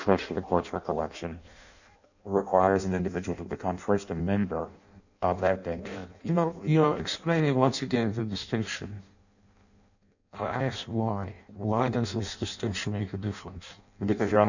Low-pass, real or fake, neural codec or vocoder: 7.2 kHz; fake; codec, 16 kHz in and 24 kHz out, 0.6 kbps, FireRedTTS-2 codec